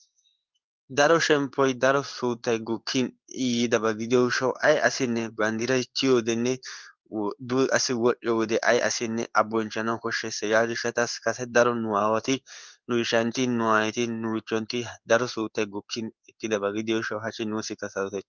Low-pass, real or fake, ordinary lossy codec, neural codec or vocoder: 7.2 kHz; fake; Opus, 32 kbps; codec, 16 kHz in and 24 kHz out, 1 kbps, XY-Tokenizer